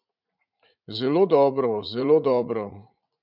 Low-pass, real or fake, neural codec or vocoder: 5.4 kHz; fake; vocoder, 44.1 kHz, 80 mel bands, Vocos